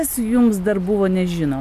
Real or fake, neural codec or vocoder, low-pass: real; none; 14.4 kHz